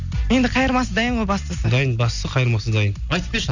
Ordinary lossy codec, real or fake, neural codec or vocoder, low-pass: none; real; none; 7.2 kHz